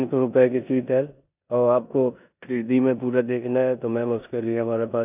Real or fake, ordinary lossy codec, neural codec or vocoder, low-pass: fake; AAC, 32 kbps; codec, 16 kHz in and 24 kHz out, 0.9 kbps, LongCat-Audio-Codec, four codebook decoder; 3.6 kHz